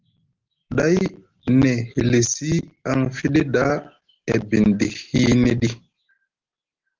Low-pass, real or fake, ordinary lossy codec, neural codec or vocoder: 7.2 kHz; real; Opus, 16 kbps; none